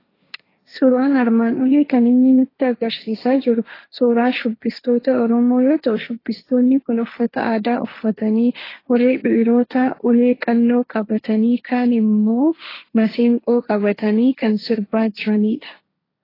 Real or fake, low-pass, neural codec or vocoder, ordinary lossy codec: fake; 5.4 kHz; codec, 16 kHz, 1.1 kbps, Voila-Tokenizer; AAC, 24 kbps